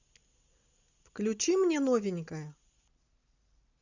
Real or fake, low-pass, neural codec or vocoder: real; 7.2 kHz; none